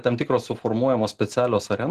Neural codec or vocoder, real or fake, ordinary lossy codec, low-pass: none; real; Opus, 16 kbps; 14.4 kHz